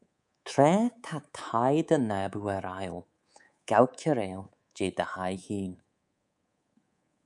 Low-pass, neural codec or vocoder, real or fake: 10.8 kHz; codec, 24 kHz, 3.1 kbps, DualCodec; fake